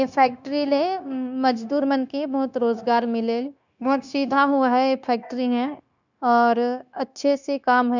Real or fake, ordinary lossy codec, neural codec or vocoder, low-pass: fake; none; codec, 16 kHz, 0.9 kbps, LongCat-Audio-Codec; 7.2 kHz